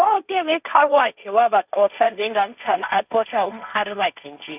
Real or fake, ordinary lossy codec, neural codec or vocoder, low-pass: fake; none; codec, 16 kHz, 1.1 kbps, Voila-Tokenizer; 3.6 kHz